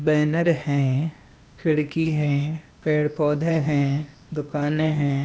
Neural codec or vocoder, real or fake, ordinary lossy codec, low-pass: codec, 16 kHz, 0.8 kbps, ZipCodec; fake; none; none